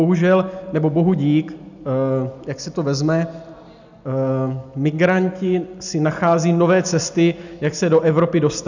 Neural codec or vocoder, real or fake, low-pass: none; real; 7.2 kHz